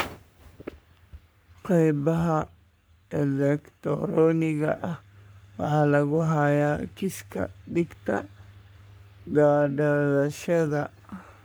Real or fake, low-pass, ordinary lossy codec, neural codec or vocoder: fake; none; none; codec, 44.1 kHz, 3.4 kbps, Pupu-Codec